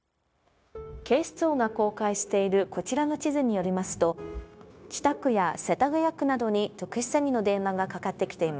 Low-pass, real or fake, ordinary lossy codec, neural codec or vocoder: none; fake; none; codec, 16 kHz, 0.9 kbps, LongCat-Audio-Codec